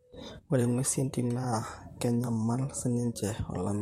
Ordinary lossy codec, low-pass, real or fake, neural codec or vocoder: MP3, 64 kbps; 19.8 kHz; fake; vocoder, 44.1 kHz, 128 mel bands, Pupu-Vocoder